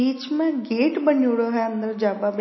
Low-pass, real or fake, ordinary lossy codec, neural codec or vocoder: 7.2 kHz; real; MP3, 24 kbps; none